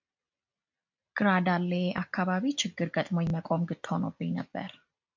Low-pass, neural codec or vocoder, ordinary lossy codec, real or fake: 7.2 kHz; none; MP3, 64 kbps; real